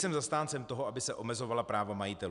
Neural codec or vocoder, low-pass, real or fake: none; 10.8 kHz; real